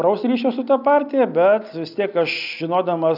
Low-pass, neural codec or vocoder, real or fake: 5.4 kHz; none; real